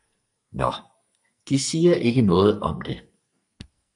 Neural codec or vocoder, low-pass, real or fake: codec, 44.1 kHz, 2.6 kbps, SNAC; 10.8 kHz; fake